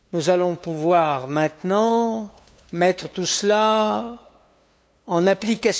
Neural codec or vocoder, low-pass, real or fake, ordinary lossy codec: codec, 16 kHz, 2 kbps, FunCodec, trained on LibriTTS, 25 frames a second; none; fake; none